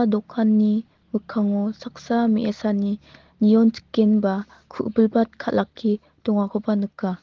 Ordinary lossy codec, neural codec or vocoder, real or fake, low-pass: Opus, 16 kbps; none; real; 7.2 kHz